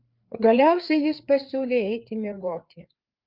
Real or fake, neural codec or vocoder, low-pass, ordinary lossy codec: fake; codec, 16 kHz, 4 kbps, FreqCodec, larger model; 5.4 kHz; Opus, 32 kbps